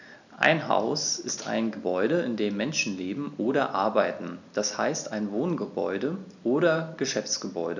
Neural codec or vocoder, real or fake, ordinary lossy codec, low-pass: none; real; none; 7.2 kHz